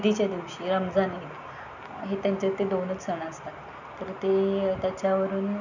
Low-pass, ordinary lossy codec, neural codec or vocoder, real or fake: 7.2 kHz; none; none; real